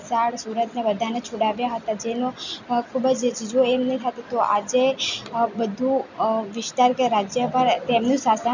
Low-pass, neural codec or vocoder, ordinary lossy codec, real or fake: 7.2 kHz; none; none; real